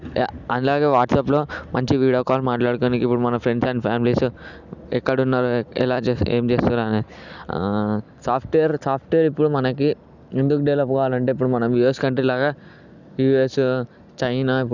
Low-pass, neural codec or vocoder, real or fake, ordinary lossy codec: 7.2 kHz; none; real; none